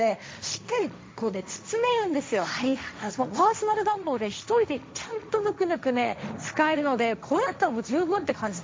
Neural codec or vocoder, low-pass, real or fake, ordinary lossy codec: codec, 16 kHz, 1.1 kbps, Voila-Tokenizer; none; fake; none